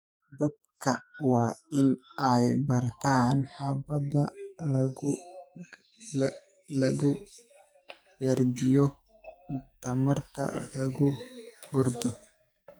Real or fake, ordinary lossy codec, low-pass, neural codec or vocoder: fake; none; none; codec, 44.1 kHz, 2.6 kbps, SNAC